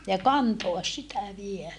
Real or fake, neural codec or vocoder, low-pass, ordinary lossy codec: real; none; 10.8 kHz; none